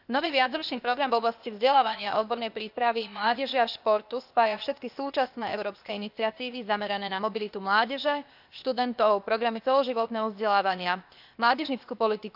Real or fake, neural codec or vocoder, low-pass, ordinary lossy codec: fake; codec, 16 kHz, 0.8 kbps, ZipCodec; 5.4 kHz; none